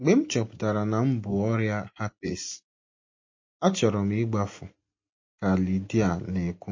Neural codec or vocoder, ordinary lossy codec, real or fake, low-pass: none; MP3, 32 kbps; real; 7.2 kHz